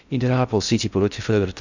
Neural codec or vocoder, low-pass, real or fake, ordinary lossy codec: codec, 16 kHz in and 24 kHz out, 0.6 kbps, FocalCodec, streaming, 2048 codes; 7.2 kHz; fake; Opus, 64 kbps